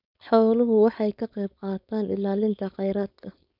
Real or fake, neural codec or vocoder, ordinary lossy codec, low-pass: fake; codec, 16 kHz, 4.8 kbps, FACodec; none; 5.4 kHz